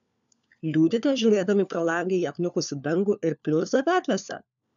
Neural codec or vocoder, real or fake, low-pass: codec, 16 kHz, 4 kbps, FunCodec, trained on LibriTTS, 50 frames a second; fake; 7.2 kHz